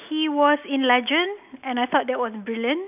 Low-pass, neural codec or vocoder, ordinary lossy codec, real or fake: 3.6 kHz; none; none; real